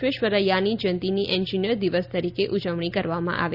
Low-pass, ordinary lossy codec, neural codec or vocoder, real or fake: 5.4 kHz; Opus, 64 kbps; none; real